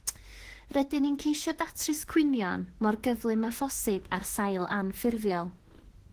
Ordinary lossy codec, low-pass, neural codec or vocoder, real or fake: Opus, 16 kbps; 14.4 kHz; autoencoder, 48 kHz, 32 numbers a frame, DAC-VAE, trained on Japanese speech; fake